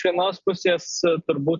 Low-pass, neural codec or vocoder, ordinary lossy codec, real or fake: 7.2 kHz; none; MP3, 96 kbps; real